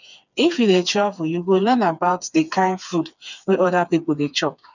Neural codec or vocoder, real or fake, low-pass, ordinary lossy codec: codec, 16 kHz, 4 kbps, FreqCodec, smaller model; fake; 7.2 kHz; none